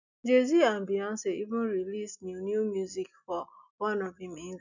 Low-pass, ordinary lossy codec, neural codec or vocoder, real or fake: 7.2 kHz; none; none; real